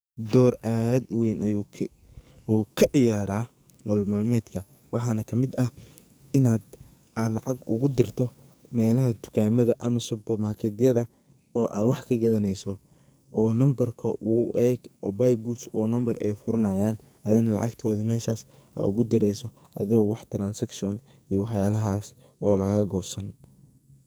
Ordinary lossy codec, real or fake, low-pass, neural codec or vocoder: none; fake; none; codec, 44.1 kHz, 2.6 kbps, SNAC